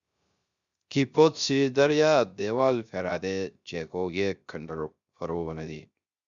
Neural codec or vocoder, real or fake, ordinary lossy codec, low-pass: codec, 16 kHz, 0.3 kbps, FocalCodec; fake; Opus, 64 kbps; 7.2 kHz